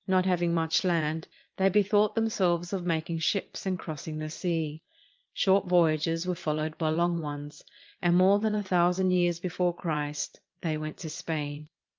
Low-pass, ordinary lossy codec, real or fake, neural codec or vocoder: 7.2 kHz; Opus, 32 kbps; fake; vocoder, 22.05 kHz, 80 mel bands, Vocos